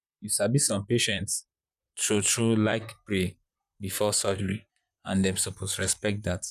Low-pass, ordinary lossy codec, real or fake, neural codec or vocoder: 14.4 kHz; none; fake; vocoder, 44.1 kHz, 128 mel bands, Pupu-Vocoder